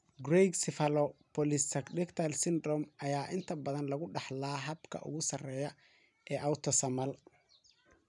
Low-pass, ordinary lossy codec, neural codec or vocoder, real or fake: 10.8 kHz; none; vocoder, 44.1 kHz, 128 mel bands every 256 samples, BigVGAN v2; fake